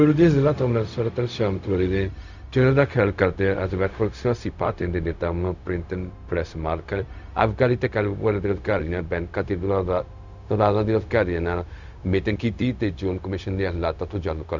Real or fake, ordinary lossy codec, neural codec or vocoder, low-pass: fake; none; codec, 16 kHz, 0.4 kbps, LongCat-Audio-Codec; 7.2 kHz